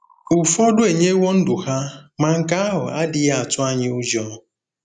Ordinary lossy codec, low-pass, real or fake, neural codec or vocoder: none; 9.9 kHz; real; none